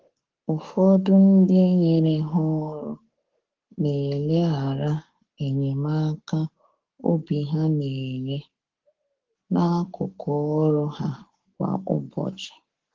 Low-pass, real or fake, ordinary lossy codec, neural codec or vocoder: 7.2 kHz; fake; Opus, 16 kbps; codec, 44.1 kHz, 7.8 kbps, Pupu-Codec